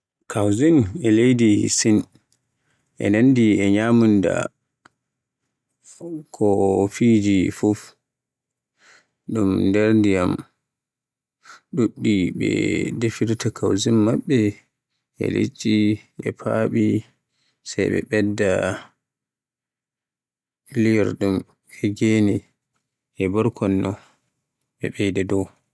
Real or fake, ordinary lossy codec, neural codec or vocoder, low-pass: real; none; none; none